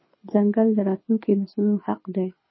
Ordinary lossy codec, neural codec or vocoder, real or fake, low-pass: MP3, 24 kbps; codec, 24 kHz, 6 kbps, HILCodec; fake; 7.2 kHz